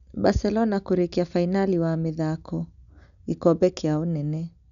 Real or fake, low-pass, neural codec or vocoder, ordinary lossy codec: real; 7.2 kHz; none; none